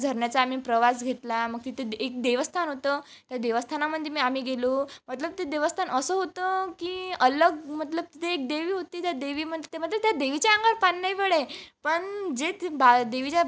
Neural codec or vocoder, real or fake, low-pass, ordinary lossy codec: none; real; none; none